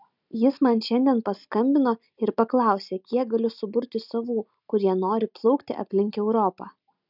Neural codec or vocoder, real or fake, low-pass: none; real; 5.4 kHz